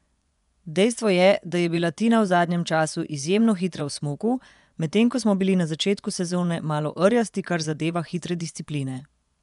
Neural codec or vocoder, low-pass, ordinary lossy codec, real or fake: vocoder, 24 kHz, 100 mel bands, Vocos; 10.8 kHz; none; fake